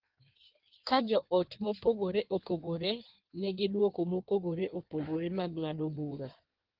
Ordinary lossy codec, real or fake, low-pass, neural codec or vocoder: Opus, 16 kbps; fake; 5.4 kHz; codec, 16 kHz in and 24 kHz out, 1.1 kbps, FireRedTTS-2 codec